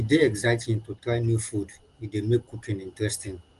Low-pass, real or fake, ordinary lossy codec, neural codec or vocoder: 10.8 kHz; real; Opus, 24 kbps; none